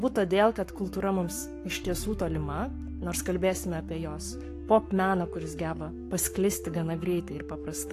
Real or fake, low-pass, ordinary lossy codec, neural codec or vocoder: fake; 14.4 kHz; AAC, 64 kbps; codec, 44.1 kHz, 7.8 kbps, Pupu-Codec